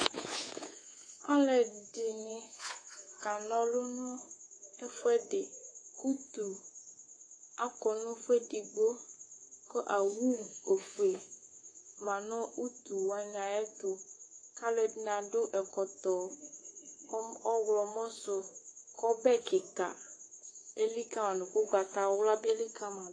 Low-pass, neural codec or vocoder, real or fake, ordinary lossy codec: 9.9 kHz; codec, 24 kHz, 3.1 kbps, DualCodec; fake; AAC, 32 kbps